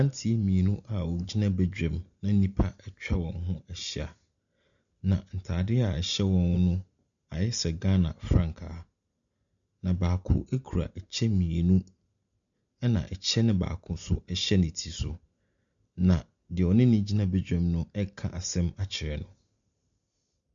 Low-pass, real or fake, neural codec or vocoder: 7.2 kHz; real; none